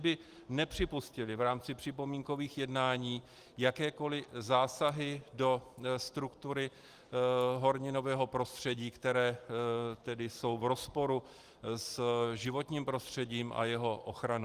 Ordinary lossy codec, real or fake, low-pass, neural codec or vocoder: Opus, 24 kbps; real; 14.4 kHz; none